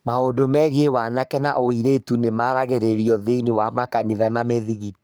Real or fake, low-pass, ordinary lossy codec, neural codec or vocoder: fake; none; none; codec, 44.1 kHz, 3.4 kbps, Pupu-Codec